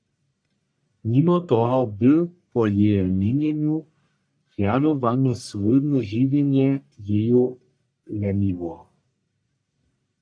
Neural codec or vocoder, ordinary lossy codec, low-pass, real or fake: codec, 44.1 kHz, 1.7 kbps, Pupu-Codec; AAC, 64 kbps; 9.9 kHz; fake